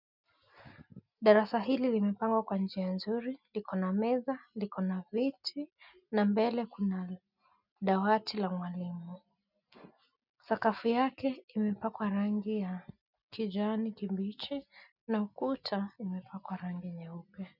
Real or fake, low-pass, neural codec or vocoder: real; 5.4 kHz; none